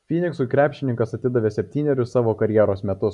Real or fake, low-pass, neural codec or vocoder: real; 10.8 kHz; none